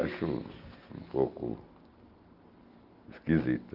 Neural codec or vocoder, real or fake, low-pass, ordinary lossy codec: none; real; 5.4 kHz; Opus, 32 kbps